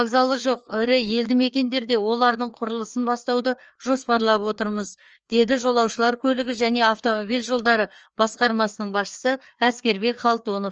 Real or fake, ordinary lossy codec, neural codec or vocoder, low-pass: fake; Opus, 24 kbps; codec, 16 kHz, 2 kbps, FreqCodec, larger model; 7.2 kHz